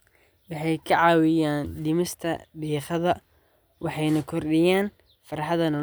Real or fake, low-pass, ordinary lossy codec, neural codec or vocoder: real; none; none; none